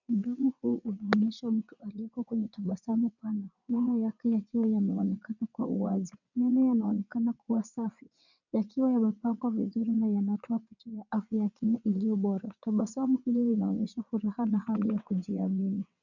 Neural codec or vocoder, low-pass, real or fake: vocoder, 22.05 kHz, 80 mel bands, WaveNeXt; 7.2 kHz; fake